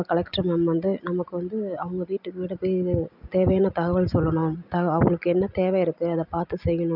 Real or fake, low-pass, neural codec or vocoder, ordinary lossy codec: real; 5.4 kHz; none; none